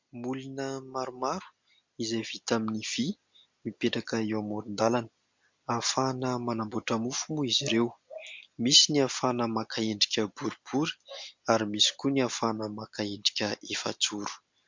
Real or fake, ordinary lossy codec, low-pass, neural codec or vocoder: real; MP3, 64 kbps; 7.2 kHz; none